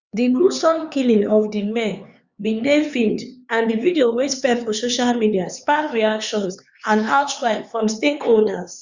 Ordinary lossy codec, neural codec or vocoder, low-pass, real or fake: Opus, 64 kbps; codec, 16 kHz, 4 kbps, X-Codec, HuBERT features, trained on LibriSpeech; 7.2 kHz; fake